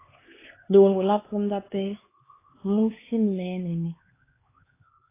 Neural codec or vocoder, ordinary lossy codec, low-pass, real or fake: codec, 16 kHz, 4 kbps, X-Codec, HuBERT features, trained on LibriSpeech; AAC, 16 kbps; 3.6 kHz; fake